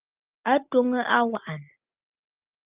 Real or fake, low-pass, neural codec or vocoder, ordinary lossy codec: real; 3.6 kHz; none; Opus, 32 kbps